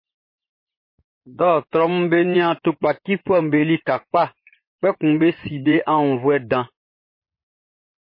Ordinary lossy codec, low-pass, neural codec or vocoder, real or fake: MP3, 24 kbps; 5.4 kHz; vocoder, 24 kHz, 100 mel bands, Vocos; fake